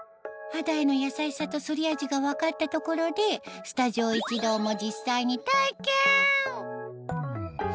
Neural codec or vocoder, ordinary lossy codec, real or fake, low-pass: none; none; real; none